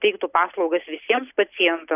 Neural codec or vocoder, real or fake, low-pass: none; real; 3.6 kHz